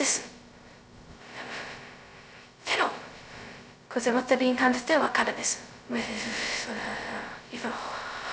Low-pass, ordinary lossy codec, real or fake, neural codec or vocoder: none; none; fake; codec, 16 kHz, 0.2 kbps, FocalCodec